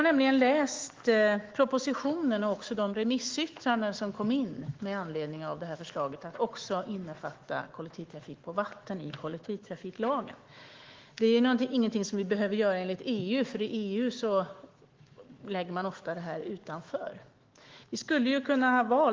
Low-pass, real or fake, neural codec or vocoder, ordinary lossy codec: 7.2 kHz; fake; autoencoder, 48 kHz, 128 numbers a frame, DAC-VAE, trained on Japanese speech; Opus, 16 kbps